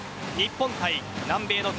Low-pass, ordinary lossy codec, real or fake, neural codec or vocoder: none; none; real; none